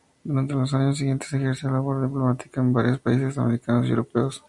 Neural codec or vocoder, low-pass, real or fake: none; 10.8 kHz; real